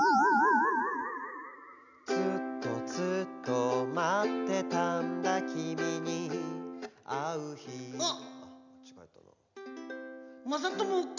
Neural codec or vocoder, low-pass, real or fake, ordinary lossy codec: none; 7.2 kHz; real; none